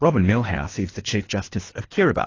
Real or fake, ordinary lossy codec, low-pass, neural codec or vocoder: fake; AAC, 32 kbps; 7.2 kHz; codec, 24 kHz, 3 kbps, HILCodec